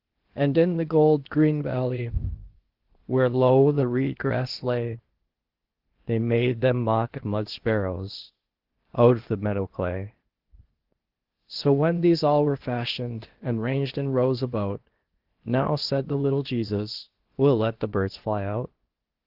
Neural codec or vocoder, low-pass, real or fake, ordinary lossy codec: codec, 16 kHz, 0.8 kbps, ZipCodec; 5.4 kHz; fake; Opus, 16 kbps